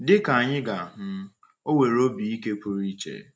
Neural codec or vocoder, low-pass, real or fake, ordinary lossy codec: none; none; real; none